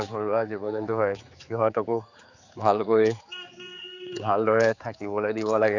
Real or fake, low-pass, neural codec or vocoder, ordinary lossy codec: fake; 7.2 kHz; codec, 16 kHz, 4 kbps, X-Codec, HuBERT features, trained on general audio; none